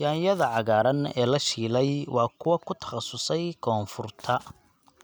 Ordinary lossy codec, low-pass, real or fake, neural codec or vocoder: none; none; real; none